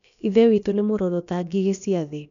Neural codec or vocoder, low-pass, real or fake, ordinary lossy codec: codec, 16 kHz, about 1 kbps, DyCAST, with the encoder's durations; 7.2 kHz; fake; none